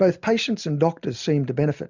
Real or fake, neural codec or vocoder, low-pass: real; none; 7.2 kHz